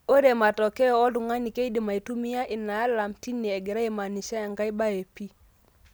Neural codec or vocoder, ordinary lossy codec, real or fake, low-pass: none; none; real; none